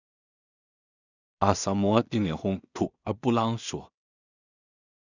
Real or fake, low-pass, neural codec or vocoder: fake; 7.2 kHz; codec, 16 kHz in and 24 kHz out, 0.4 kbps, LongCat-Audio-Codec, two codebook decoder